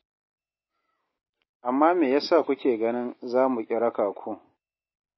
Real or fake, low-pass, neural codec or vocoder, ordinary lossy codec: real; 7.2 kHz; none; MP3, 24 kbps